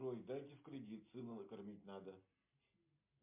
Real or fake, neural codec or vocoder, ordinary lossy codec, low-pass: real; none; MP3, 32 kbps; 3.6 kHz